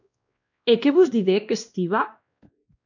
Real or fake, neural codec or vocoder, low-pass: fake; codec, 16 kHz, 1 kbps, X-Codec, WavLM features, trained on Multilingual LibriSpeech; 7.2 kHz